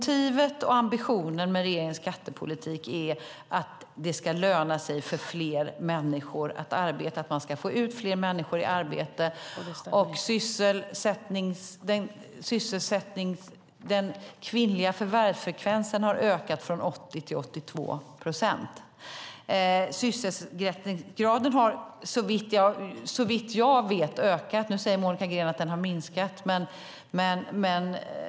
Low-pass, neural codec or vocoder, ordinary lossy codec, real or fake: none; none; none; real